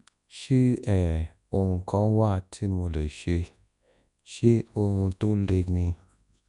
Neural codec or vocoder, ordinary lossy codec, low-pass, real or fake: codec, 24 kHz, 0.9 kbps, WavTokenizer, large speech release; none; 10.8 kHz; fake